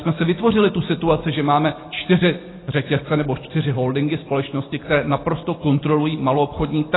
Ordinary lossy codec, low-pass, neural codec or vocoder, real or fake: AAC, 16 kbps; 7.2 kHz; none; real